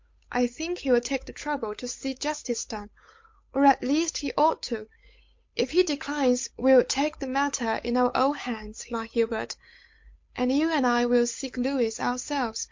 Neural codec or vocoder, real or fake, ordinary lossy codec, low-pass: codec, 16 kHz, 8 kbps, FunCodec, trained on Chinese and English, 25 frames a second; fake; MP3, 48 kbps; 7.2 kHz